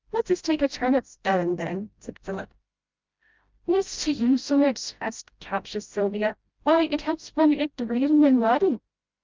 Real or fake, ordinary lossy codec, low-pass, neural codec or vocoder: fake; Opus, 24 kbps; 7.2 kHz; codec, 16 kHz, 0.5 kbps, FreqCodec, smaller model